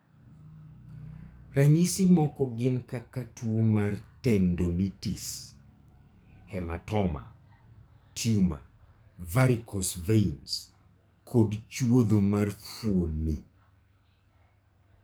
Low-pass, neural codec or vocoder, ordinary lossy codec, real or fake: none; codec, 44.1 kHz, 2.6 kbps, SNAC; none; fake